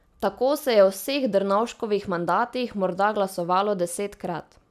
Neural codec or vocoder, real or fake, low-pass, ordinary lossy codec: none; real; 14.4 kHz; none